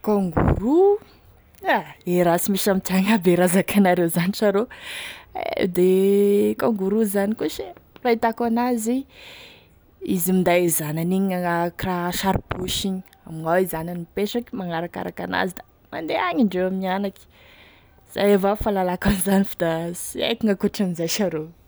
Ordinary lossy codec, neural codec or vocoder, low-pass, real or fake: none; none; none; real